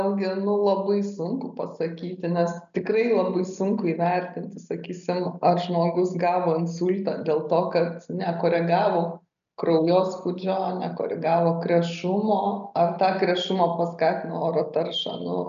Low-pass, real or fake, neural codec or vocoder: 7.2 kHz; real; none